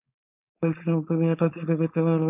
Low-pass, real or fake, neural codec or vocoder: 3.6 kHz; fake; codec, 16 kHz, 4.8 kbps, FACodec